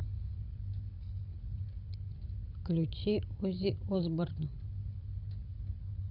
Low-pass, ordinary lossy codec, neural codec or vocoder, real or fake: 5.4 kHz; none; codec, 16 kHz, 16 kbps, FreqCodec, larger model; fake